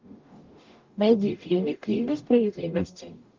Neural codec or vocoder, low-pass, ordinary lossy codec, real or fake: codec, 44.1 kHz, 0.9 kbps, DAC; 7.2 kHz; Opus, 32 kbps; fake